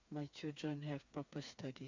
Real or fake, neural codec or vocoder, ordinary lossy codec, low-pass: fake; codec, 16 kHz, 4 kbps, FreqCodec, smaller model; Opus, 64 kbps; 7.2 kHz